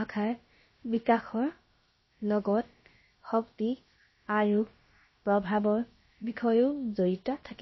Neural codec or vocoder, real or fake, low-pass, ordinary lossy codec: codec, 16 kHz, about 1 kbps, DyCAST, with the encoder's durations; fake; 7.2 kHz; MP3, 24 kbps